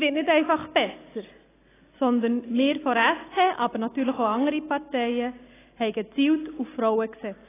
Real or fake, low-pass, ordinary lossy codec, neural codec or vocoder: real; 3.6 kHz; AAC, 16 kbps; none